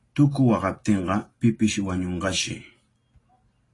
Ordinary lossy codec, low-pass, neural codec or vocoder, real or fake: AAC, 32 kbps; 10.8 kHz; none; real